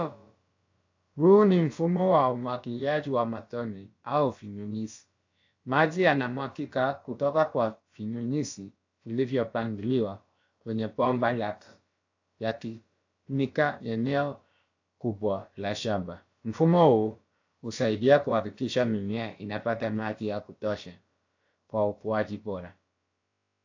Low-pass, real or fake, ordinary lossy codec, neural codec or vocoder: 7.2 kHz; fake; AAC, 48 kbps; codec, 16 kHz, about 1 kbps, DyCAST, with the encoder's durations